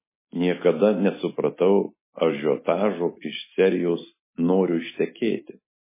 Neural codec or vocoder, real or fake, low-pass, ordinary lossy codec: none; real; 3.6 kHz; MP3, 16 kbps